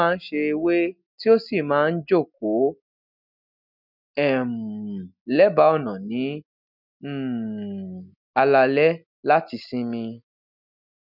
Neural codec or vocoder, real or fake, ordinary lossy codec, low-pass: none; real; none; 5.4 kHz